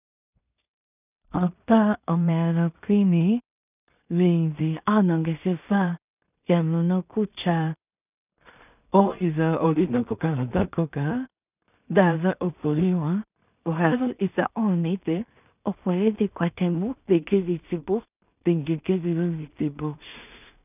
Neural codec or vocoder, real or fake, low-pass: codec, 16 kHz in and 24 kHz out, 0.4 kbps, LongCat-Audio-Codec, two codebook decoder; fake; 3.6 kHz